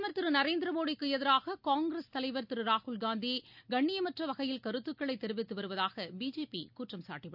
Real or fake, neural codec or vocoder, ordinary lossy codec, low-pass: real; none; none; 5.4 kHz